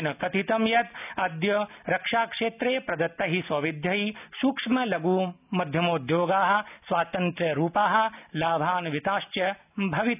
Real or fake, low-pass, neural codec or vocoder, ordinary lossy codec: real; 3.6 kHz; none; none